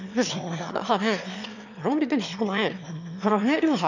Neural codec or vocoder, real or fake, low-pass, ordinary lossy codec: autoencoder, 22.05 kHz, a latent of 192 numbers a frame, VITS, trained on one speaker; fake; 7.2 kHz; none